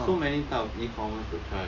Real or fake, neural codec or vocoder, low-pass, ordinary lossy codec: real; none; 7.2 kHz; none